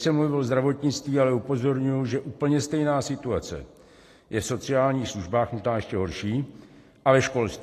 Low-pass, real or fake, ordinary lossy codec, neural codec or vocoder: 14.4 kHz; real; AAC, 48 kbps; none